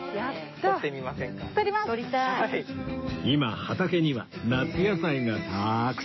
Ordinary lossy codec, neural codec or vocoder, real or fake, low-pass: MP3, 24 kbps; autoencoder, 48 kHz, 128 numbers a frame, DAC-VAE, trained on Japanese speech; fake; 7.2 kHz